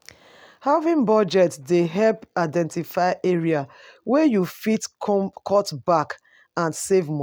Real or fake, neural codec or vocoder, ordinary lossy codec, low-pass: real; none; none; 19.8 kHz